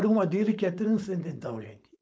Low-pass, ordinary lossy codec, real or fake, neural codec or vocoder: none; none; fake; codec, 16 kHz, 4.8 kbps, FACodec